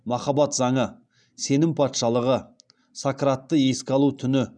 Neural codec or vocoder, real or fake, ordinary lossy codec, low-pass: none; real; none; 9.9 kHz